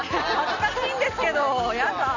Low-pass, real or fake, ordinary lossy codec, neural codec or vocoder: 7.2 kHz; real; none; none